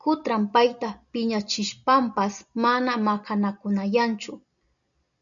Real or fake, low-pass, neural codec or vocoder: real; 7.2 kHz; none